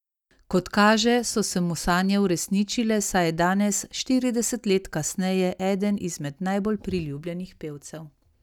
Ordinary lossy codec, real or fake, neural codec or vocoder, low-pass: none; real; none; 19.8 kHz